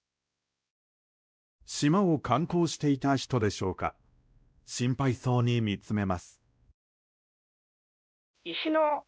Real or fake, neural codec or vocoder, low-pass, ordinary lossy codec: fake; codec, 16 kHz, 1 kbps, X-Codec, WavLM features, trained on Multilingual LibriSpeech; none; none